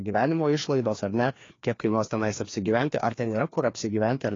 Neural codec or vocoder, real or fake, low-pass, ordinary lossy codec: codec, 16 kHz, 2 kbps, FreqCodec, larger model; fake; 7.2 kHz; AAC, 32 kbps